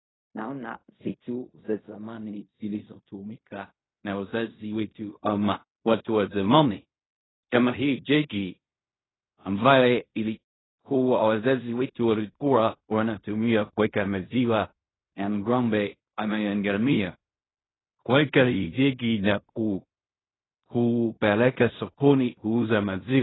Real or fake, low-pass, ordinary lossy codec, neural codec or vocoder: fake; 7.2 kHz; AAC, 16 kbps; codec, 16 kHz in and 24 kHz out, 0.4 kbps, LongCat-Audio-Codec, fine tuned four codebook decoder